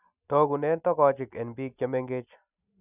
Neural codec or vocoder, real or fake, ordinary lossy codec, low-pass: none; real; none; 3.6 kHz